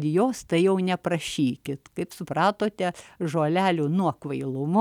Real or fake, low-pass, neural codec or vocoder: real; 19.8 kHz; none